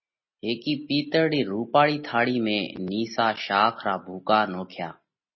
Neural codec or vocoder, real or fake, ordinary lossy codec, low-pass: none; real; MP3, 24 kbps; 7.2 kHz